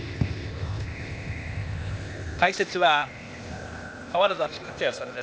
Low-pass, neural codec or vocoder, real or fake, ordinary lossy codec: none; codec, 16 kHz, 0.8 kbps, ZipCodec; fake; none